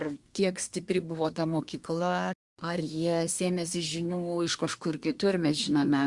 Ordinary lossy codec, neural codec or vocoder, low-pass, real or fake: Opus, 64 kbps; codec, 24 kHz, 1 kbps, SNAC; 10.8 kHz; fake